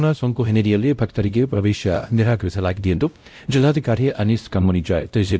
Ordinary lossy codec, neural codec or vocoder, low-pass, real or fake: none; codec, 16 kHz, 0.5 kbps, X-Codec, WavLM features, trained on Multilingual LibriSpeech; none; fake